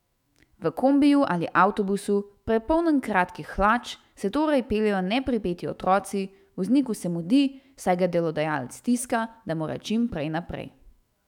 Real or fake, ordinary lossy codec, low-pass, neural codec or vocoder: fake; none; 19.8 kHz; autoencoder, 48 kHz, 128 numbers a frame, DAC-VAE, trained on Japanese speech